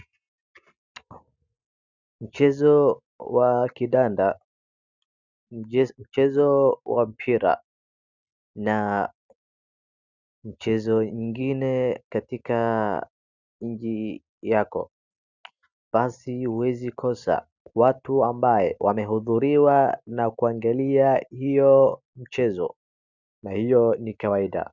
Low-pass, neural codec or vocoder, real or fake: 7.2 kHz; none; real